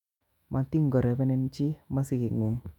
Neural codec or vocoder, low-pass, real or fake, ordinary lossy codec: autoencoder, 48 kHz, 128 numbers a frame, DAC-VAE, trained on Japanese speech; 19.8 kHz; fake; none